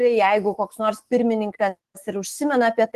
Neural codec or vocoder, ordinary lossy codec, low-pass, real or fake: autoencoder, 48 kHz, 128 numbers a frame, DAC-VAE, trained on Japanese speech; Opus, 16 kbps; 14.4 kHz; fake